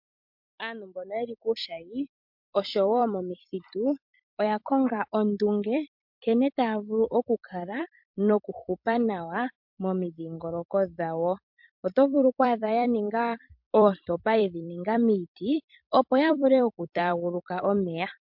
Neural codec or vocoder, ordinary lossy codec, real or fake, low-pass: vocoder, 44.1 kHz, 128 mel bands every 256 samples, BigVGAN v2; MP3, 48 kbps; fake; 5.4 kHz